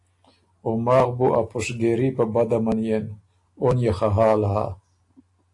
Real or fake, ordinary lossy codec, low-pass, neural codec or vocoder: real; AAC, 48 kbps; 10.8 kHz; none